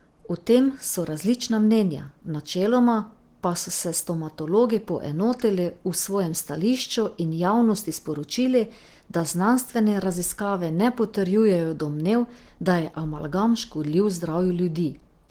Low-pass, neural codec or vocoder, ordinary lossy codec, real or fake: 19.8 kHz; none; Opus, 16 kbps; real